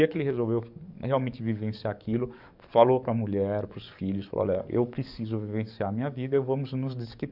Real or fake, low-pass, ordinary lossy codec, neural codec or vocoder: fake; 5.4 kHz; none; codec, 44.1 kHz, 7.8 kbps, DAC